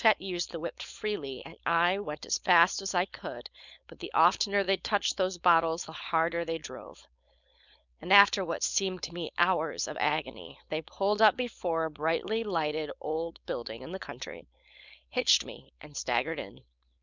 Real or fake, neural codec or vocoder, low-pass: fake; codec, 16 kHz, 4.8 kbps, FACodec; 7.2 kHz